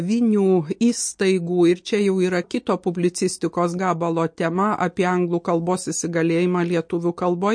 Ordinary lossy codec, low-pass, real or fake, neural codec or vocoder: MP3, 48 kbps; 9.9 kHz; real; none